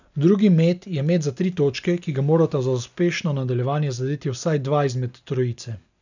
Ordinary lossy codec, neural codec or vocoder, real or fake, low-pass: none; none; real; 7.2 kHz